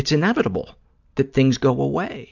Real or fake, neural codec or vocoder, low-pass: real; none; 7.2 kHz